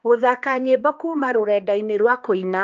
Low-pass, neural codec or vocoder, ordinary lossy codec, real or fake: 7.2 kHz; codec, 16 kHz, 2 kbps, X-Codec, HuBERT features, trained on balanced general audio; Opus, 32 kbps; fake